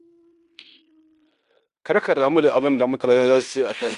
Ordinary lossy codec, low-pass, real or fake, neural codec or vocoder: Opus, 64 kbps; 10.8 kHz; fake; codec, 16 kHz in and 24 kHz out, 0.9 kbps, LongCat-Audio-Codec, fine tuned four codebook decoder